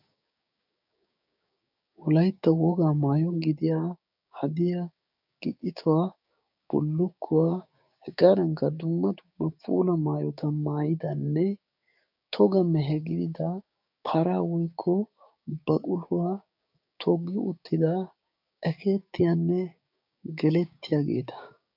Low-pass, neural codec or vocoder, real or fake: 5.4 kHz; codec, 16 kHz, 6 kbps, DAC; fake